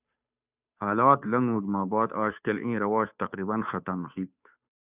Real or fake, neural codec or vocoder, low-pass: fake; codec, 16 kHz, 2 kbps, FunCodec, trained on Chinese and English, 25 frames a second; 3.6 kHz